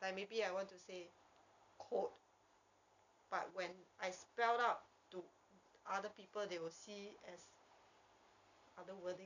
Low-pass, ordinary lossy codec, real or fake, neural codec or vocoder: 7.2 kHz; none; real; none